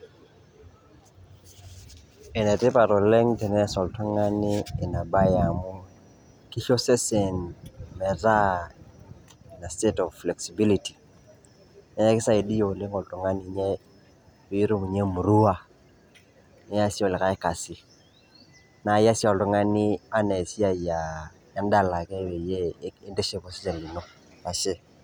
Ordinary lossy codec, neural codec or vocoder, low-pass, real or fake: none; none; none; real